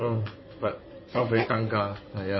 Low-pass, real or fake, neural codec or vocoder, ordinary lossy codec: 7.2 kHz; fake; codec, 16 kHz in and 24 kHz out, 2.2 kbps, FireRedTTS-2 codec; MP3, 24 kbps